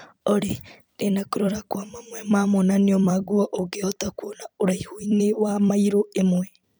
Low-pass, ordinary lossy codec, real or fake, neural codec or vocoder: none; none; fake; vocoder, 44.1 kHz, 128 mel bands every 256 samples, BigVGAN v2